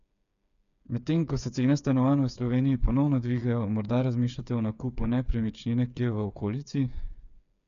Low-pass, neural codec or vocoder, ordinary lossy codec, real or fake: 7.2 kHz; codec, 16 kHz, 8 kbps, FreqCodec, smaller model; none; fake